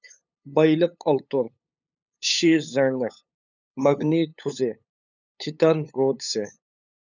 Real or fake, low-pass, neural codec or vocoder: fake; 7.2 kHz; codec, 16 kHz, 8 kbps, FunCodec, trained on LibriTTS, 25 frames a second